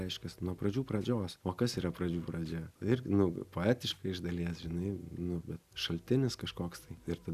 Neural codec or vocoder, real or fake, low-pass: none; real; 14.4 kHz